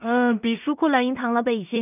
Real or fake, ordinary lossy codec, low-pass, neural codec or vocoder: fake; none; 3.6 kHz; codec, 16 kHz in and 24 kHz out, 0.4 kbps, LongCat-Audio-Codec, two codebook decoder